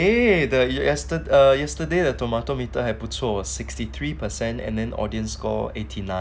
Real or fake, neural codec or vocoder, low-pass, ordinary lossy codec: real; none; none; none